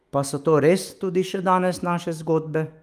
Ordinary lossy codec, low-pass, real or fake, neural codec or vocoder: Opus, 32 kbps; 14.4 kHz; fake; autoencoder, 48 kHz, 128 numbers a frame, DAC-VAE, trained on Japanese speech